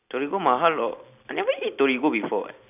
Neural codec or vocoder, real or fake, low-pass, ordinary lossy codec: none; real; 3.6 kHz; none